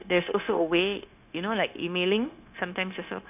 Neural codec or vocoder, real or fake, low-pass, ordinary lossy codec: codec, 16 kHz, 0.9 kbps, LongCat-Audio-Codec; fake; 3.6 kHz; none